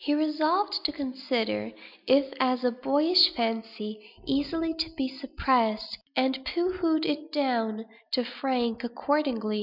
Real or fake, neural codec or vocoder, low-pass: real; none; 5.4 kHz